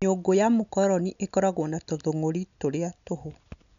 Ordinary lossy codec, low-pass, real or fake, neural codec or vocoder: none; 7.2 kHz; real; none